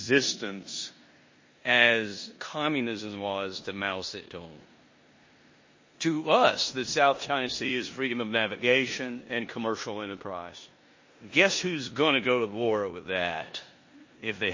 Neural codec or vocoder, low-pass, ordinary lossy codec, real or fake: codec, 16 kHz in and 24 kHz out, 0.9 kbps, LongCat-Audio-Codec, four codebook decoder; 7.2 kHz; MP3, 32 kbps; fake